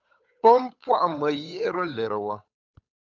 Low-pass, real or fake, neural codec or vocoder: 7.2 kHz; fake; codec, 16 kHz, 8 kbps, FunCodec, trained on Chinese and English, 25 frames a second